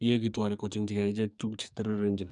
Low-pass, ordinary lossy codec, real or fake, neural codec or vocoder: 10.8 kHz; none; fake; codec, 44.1 kHz, 2.6 kbps, SNAC